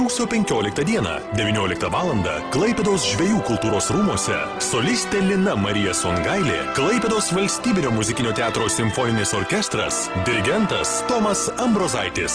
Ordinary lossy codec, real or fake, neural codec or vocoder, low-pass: Opus, 16 kbps; real; none; 9.9 kHz